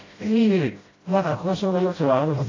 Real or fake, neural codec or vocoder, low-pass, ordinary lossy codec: fake; codec, 16 kHz, 0.5 kbps, FreqCodec, smaller model; 7.2 kHz; AAC, 32 kbps